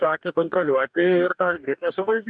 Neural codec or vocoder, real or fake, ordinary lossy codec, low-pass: codec, 44.1 kHz, 2.6 kbps, DAC; fake; MP3, 96 kbps; 9.9 kHz